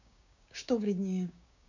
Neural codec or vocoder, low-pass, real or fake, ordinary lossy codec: codec, 16 kHz, 6 kbps, DAC; 7.2 kHz; fake; none